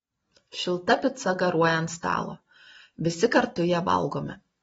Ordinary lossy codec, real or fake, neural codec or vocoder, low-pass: AAC, 24 kbps; real; none; 10.8 kHz